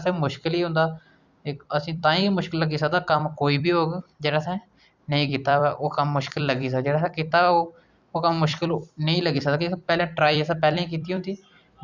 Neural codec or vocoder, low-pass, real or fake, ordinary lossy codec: vocoder, 44.1 kHz, 128 mel bands every 256 samples, BigVGAN v2; 7.2 kHz; fake; Opus, 64 kbps